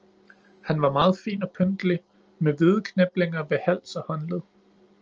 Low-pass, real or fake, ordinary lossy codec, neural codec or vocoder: 7.2 kHz; real; Opus, 24 kbps; none